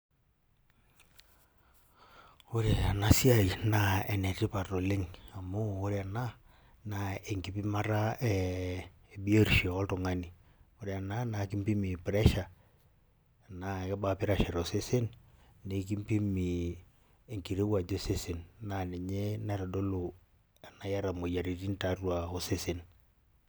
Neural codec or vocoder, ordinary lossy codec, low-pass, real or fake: none; none; none; real